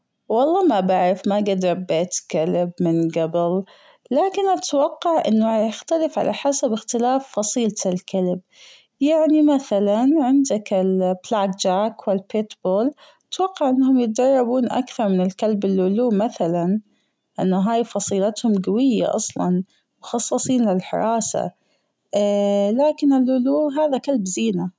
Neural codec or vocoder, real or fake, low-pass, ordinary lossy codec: none; real; none; none